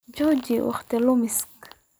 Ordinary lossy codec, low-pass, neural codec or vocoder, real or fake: none; none; none; real